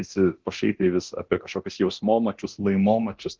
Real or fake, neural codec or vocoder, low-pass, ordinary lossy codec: fake; codec, 24 kHz, 0.9 kbps, DualCodec; 7.2 kHz; Opus, 16 kbps